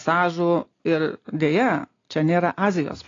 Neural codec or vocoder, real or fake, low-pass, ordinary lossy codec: none; real; 7.2 kHz; AAC, 32 kbps